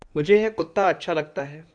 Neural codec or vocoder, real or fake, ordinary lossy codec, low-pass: codec, 16 kHz in and 24 kHz out, 2.2 kbps, FireRedTTS-2 codec; fake; MP3, 96 kbps; 9.9 kHz